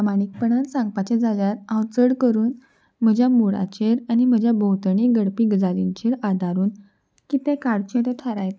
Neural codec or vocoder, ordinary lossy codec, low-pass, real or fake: none; none; none; real